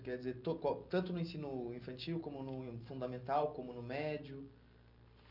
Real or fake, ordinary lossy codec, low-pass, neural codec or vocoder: real; none; 5.4 kHz; none